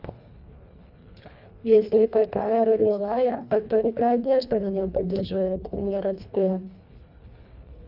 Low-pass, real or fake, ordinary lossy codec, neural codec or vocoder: 5.4 kHz; fake; none; codec, 24 kHz, 1.5 kbps, HILCodec